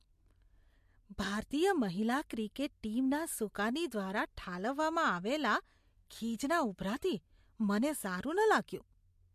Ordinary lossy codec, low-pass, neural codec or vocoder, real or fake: MP3, 64 kbps; 14.4 kHz; none; real